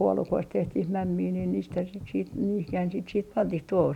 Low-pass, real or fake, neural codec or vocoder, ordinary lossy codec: 19.8 kHz; real; none; none